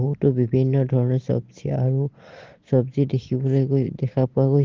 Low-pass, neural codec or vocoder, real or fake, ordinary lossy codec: 7.2 kHz; none; real; Opus, 16 kbps